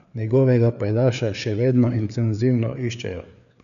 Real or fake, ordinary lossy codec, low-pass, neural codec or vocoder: fake; MP3, 96 kbps; 7.2 kHz; codec, 16 kHz, 4 kbps, FreqCodec, larger model